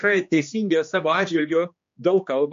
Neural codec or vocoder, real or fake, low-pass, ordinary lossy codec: codec, 16 kHz, 2 kbps, X-Codec, HuBERT features, trained on general audio; fake; 7.2 kHz; MP3, 48 kbps